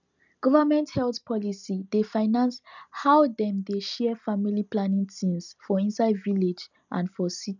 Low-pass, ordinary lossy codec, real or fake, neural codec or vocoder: 7.2 kHz; none; real; none